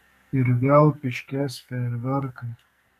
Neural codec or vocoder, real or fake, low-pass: codec, 44.1 kHz, 2.6 kbps, SNAC; fake; 14.4 kHz